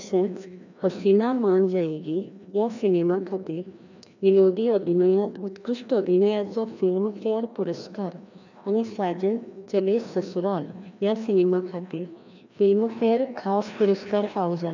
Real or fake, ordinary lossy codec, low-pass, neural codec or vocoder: fake; none; 7.2 kHz; codec, 16 kHz, 1 kbps, FreqCodec, larger model